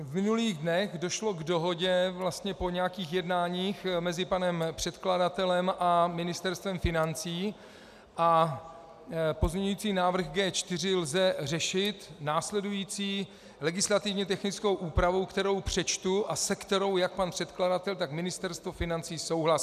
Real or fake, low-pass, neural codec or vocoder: real; 14.4 kHz; none